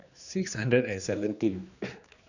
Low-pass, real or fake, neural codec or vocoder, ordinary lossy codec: 7.2 kHz; fake; codec, 16 kHz, 2 kbps, X-Codec, HuBERT features, trained on general audio; none